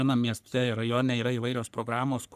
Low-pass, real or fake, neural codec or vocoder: 14.4 kHz; fake; codec, 44.1 kHz, 3.4 kbps, Pupu-Codec